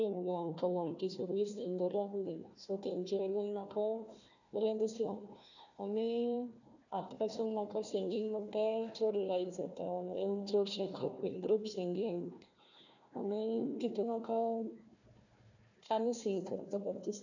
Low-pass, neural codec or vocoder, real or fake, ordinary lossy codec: 7.2 kHz; codec, 16 kHz, 1 kbps, FunCodec, trained on Chinese and English, 50 frames a second; fake; none